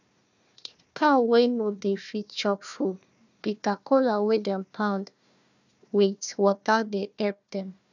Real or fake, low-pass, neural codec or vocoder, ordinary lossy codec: fake; 7.2 kHz; codec, 16 kHz, 1 kbps, FunCodec, trained on Chinese and English, 50 frames a second; none